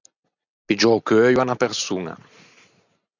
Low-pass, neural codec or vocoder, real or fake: 7.2 kHz; none; real